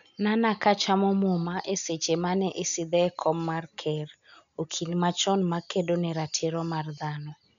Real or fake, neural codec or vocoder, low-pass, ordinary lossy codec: real; none; 7.2 kHz; MP3, 96 kbps